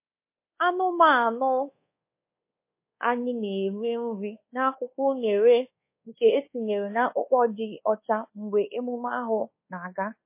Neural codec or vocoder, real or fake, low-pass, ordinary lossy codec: codec, 24 kHz, 1.2 kbps, DualCodec; fake; 3.6 kHz; MP3, 24 kbps